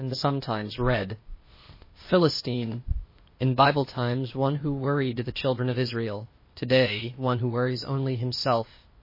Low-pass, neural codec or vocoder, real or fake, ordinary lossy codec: 5.4 kHz; codec, 16 kHz, 0.8 kbps, ZipCodec; fake; MP3, 24 kbps